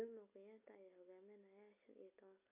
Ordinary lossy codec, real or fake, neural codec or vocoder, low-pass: AAC, 32 kbps; real; none; 3.6 kHz